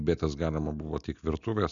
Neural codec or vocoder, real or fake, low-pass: none; real; 7.2 kHz